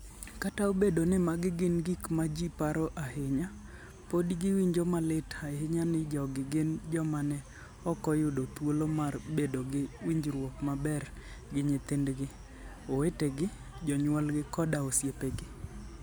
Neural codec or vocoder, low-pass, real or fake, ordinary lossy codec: none; none; real; none